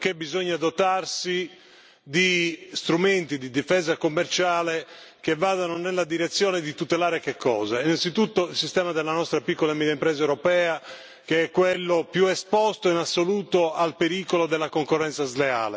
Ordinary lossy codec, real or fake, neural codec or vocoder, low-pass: none; real; none; none